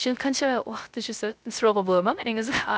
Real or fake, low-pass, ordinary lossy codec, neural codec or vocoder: fake; none; none; codec, 16 kHz, 0.3 kbps, FocalCodec